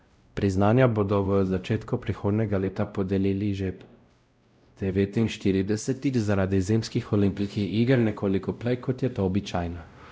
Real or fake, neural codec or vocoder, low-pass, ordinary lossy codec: fake; codec, 16 kHz, 0.5 kbps, X-Codec, WavLM features, trained on Multilingual LibriSpeech; none; none